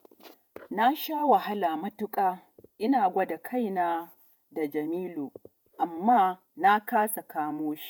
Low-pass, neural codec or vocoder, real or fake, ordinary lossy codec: none; vocoder, 48 kHz, 128 mel bands, Vocos; fake; none